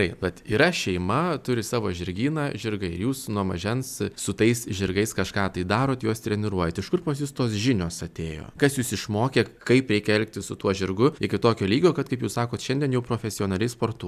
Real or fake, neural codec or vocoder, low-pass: real; none; 14.4 kHz